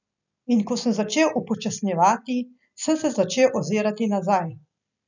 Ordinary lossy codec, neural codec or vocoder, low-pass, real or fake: none; none; 7.2 kHz; real